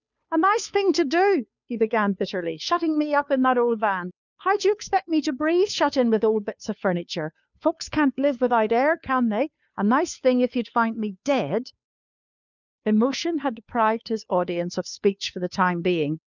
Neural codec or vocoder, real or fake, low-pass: codec, 16 kHz, 2 kbps, FunCodec, trained on Chinese and English, 25 frames a second; fake; 7.2 kHz